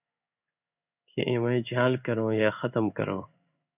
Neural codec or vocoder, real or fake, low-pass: codec, 16 kHz in and 24 kHz out, 1 kbps, XY-Tokenizer; fake; 3.6 kHz